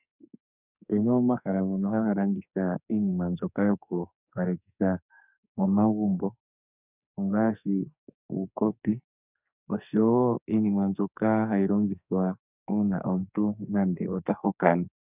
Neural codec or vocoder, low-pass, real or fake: codec, 44.1 kHz, 2.6 kbps, SNAC; 3.6 kHz; fake